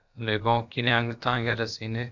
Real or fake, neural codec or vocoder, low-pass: fake; codec, 16 kHz, about 1 kbps, DyCAST, with the encoder's durations; 7.2 kHz